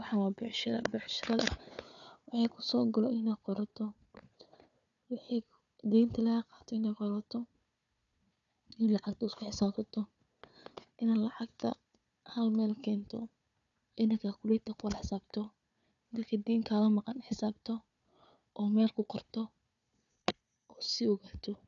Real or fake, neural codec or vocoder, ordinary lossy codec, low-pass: fake; codec, 16 kHz, 4 kbps, FunCodec, trained on Chinese and English, 50 frames a second; none; 7.2 kHz